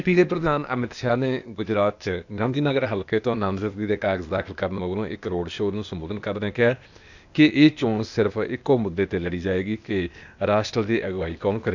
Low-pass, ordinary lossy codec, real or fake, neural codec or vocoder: 7.2 kHz; none; fake; codec, 16 kHz, 0.8 kbps, ZipCodec